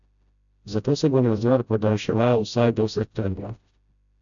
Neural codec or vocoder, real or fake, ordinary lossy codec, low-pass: codec, 16 kHz, 0.5 kbps, FreqCodec, smaller model; fake; none; 7.2 kHz